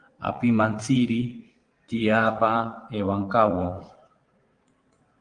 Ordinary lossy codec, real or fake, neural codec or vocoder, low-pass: Opus, 24 kbps; fake; vocoder, 22.05 kHz, 80 mel bands, WaveNeXt; 9.9 kHz